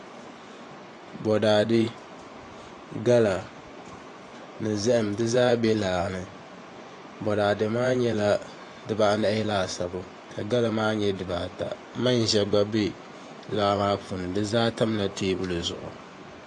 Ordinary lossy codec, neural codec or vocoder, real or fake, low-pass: AAC, 48 kbps; vocoder, 24 kHz, 100 mel bands, Vocos; fake; 10.8 kHz